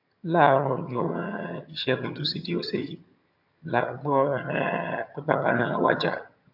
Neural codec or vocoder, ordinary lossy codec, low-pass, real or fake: vocoder, 22.05 kHz, 80 mel bands, HiFi-GAN; AAC, 48 kbps; 5.4 kHz; fake